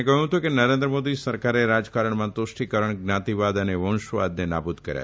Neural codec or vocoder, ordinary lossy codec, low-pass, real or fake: none; none; none; real